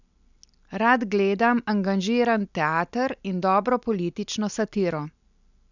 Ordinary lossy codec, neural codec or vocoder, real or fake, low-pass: none; none; real; 7.2 kHz